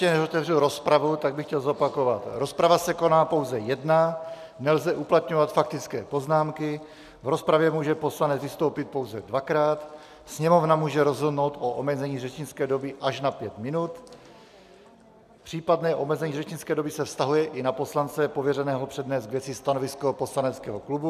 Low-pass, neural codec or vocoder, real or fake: 14.4 kHz; none; real